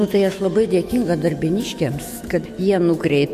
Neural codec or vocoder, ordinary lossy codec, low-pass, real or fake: autoencoder, 48 kHz, 128 numbers a frame, DAC-VAE, trained on Japanese speech; MP3, 64 kbps; 14.4 kHz; fake